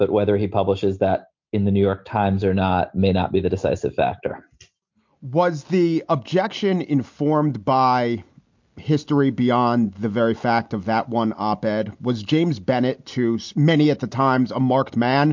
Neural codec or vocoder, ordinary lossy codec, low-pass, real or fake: none; MP3, 48 kbps; 7.2 kHz; real